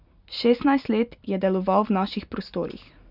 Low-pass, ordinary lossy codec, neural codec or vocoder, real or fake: 5.4 kHz; none; none; real